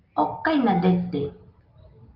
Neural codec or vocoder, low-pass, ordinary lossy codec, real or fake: none; 5.4 kHz; Opus, 32 kbps; real